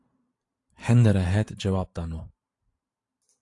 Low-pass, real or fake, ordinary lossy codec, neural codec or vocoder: 10.8 kHz; fake; AAC, 48 kbps; vocoder, 44.1 kHz, 128 mel bands every 512 samples, BigVGAN v2